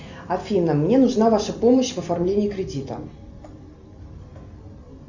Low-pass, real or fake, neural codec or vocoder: 7.2 kHz; real; none